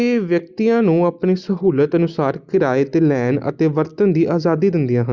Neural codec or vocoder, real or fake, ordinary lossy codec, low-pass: none; real; Opus, 64 kbps; 7.2 kHz